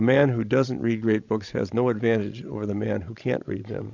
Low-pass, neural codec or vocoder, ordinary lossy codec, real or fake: 7.2 kHz; codec, 16 kHz, 16 kbps, FreqCodec, larger model; MP3, 64 kbps; fake